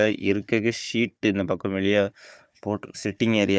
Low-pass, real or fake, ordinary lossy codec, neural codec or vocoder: none; fake; none; codec, 16 kHz, 4 kbps, FunCodec, trained on Chinese and English, 50 frames a second